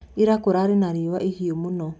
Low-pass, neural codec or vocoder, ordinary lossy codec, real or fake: none; none; none; real